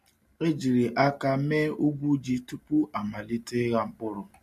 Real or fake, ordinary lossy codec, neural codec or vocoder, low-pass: real; MP3, 64 kbps; none; 14.4 kHz